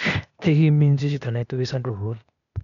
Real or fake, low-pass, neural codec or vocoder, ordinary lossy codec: fake; 7.2 kHz; codec, 16 kHz, 0.8 kbps, ZipCodec; none